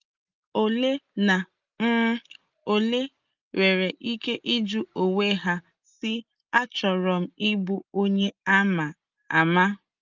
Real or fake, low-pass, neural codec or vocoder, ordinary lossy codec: real; 7.2 kHz; none; Opus, 32 kbps